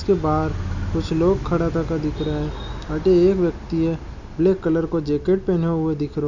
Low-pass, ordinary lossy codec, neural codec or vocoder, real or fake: 7.2 kHz; none; none; real